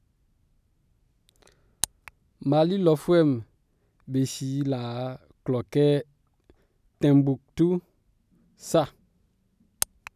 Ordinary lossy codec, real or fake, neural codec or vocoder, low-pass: none; real; none; 14.4 kHz